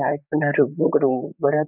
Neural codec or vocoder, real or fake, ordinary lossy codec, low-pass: vocoder, 44.1 kHz, 128 mel bands, Pupu-Vocoder; fake; none; 3.6 kHz